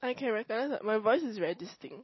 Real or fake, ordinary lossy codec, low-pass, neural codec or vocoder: real; MP3, 24 kbps; 7.2 kHz; none